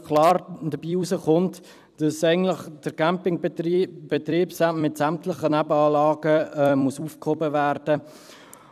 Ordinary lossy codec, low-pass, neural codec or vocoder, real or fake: none; 14.4 kHz; vocoder, 44.1 kHz, 128 mel bands every 256 samples, BigVGAN v2; fake